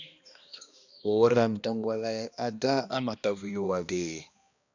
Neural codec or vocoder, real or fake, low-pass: codec, 16 kHz, 1 kbps, X-Codec, HuBERT features, trained on balanced general audio; fake; 7.2 kHz